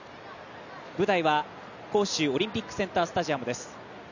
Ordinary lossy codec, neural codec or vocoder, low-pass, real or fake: none; none; 7.2 kHz; real